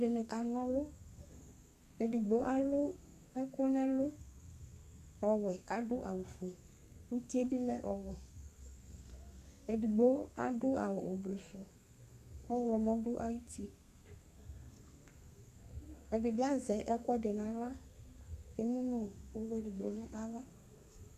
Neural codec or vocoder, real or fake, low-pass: codec, 32 kHz, 1.9 kbps, SNAC; fake; 14.4 kHz